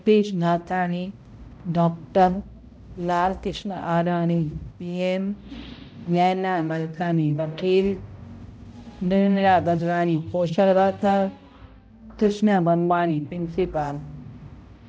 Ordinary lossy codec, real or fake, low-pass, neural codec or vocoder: none; fake; none; codec, 16 kHz, 0.5 kbps, X-Codec, HuBERT features, trained on balanced general audio